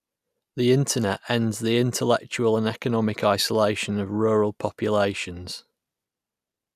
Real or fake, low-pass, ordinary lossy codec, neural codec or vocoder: real; 14.4 kHz; none; none